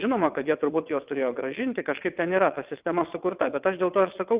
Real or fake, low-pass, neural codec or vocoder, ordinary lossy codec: fake; 3.6 kHz; vocoder, 22.05 kHz, 80 mel bands, WaveNeXt; Opus, 32 kbps